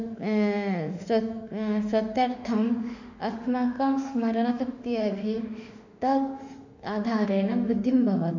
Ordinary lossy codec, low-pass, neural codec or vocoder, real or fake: none; 7.2 kHz; autoencoder, 48 kHz, 32 numbers a frame, DAC-VAE, trained on Japanese speech; fake